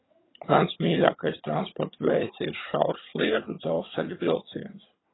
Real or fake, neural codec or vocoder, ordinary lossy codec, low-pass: fake; vocoder, 22.05 kHz, 80 mel bands, HiFi-GAN; AAC, 16 kbps; 7.2 kHz